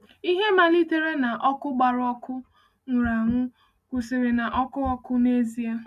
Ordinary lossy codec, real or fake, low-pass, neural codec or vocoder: none; real; 14.4 kHz; none